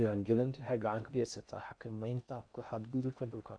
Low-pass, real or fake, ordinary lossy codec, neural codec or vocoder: 9.9 kHz; fake; none; codec, 16 kHz in and 24 kHz out, 0.6 kbps, FocalCodec, streaming, 4096 codes